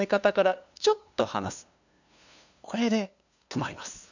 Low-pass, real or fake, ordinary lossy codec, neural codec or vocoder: 7.2 kHz; fake; none; codec, 16 kHz, 2 kbps, FunCodec, trained on LibriTTS, 25 frames a second